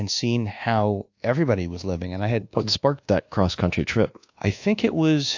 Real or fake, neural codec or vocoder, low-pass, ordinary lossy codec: fake; codec, 24 kHz, 1.2 kbps, DualCodec; 7.2 kHz; AAC, 48 kbps